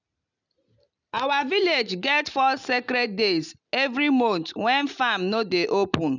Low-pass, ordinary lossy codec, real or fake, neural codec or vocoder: 7.2 kHz; none; real; none